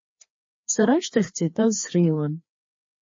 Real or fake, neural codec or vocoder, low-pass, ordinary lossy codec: fake; codec, 16 kHz, 2 kbps, FreqCodec, larger model; 7.2 kHz; MP3, 32 kbps